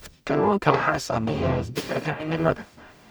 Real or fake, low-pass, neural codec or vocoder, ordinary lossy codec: fake; none; codec, 44.1 kHz, 0.9 kbps, DAC; none